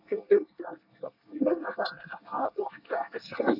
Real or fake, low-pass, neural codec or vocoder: fake; 5.4 kHz; codec, 24 kHz, 1 kbps, SNAC